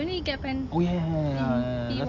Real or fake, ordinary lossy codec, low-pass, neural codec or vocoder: real; none; 7.2 kHz; none